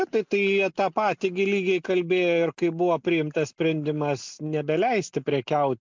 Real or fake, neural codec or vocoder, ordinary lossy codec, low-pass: real; none; MP3, 64 kbps; 7.2 kHz